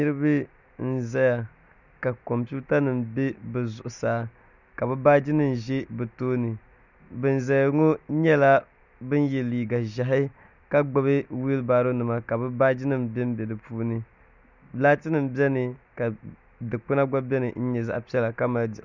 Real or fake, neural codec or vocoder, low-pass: real; none; 7.2 kHz